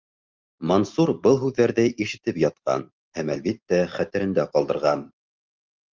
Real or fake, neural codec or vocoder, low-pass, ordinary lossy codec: real; none; 7.2 kHz; Opus, 32 kbps